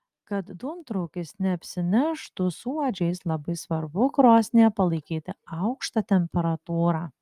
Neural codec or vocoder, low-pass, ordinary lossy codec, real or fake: none; 14.4 kHz; Opus, 32 kbps; real